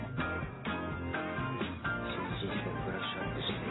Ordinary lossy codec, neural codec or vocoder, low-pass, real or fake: AAC, 16 kbps; none; 7.2 kHz; real